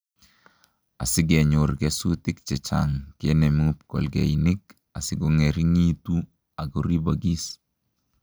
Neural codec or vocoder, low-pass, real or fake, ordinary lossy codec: none; none; real; none